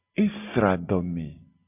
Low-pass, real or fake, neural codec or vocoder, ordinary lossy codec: 3.6 kHz; real; none; AAC, 32 kbps